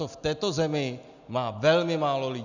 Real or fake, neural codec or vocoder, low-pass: real; none; 7.2 kHz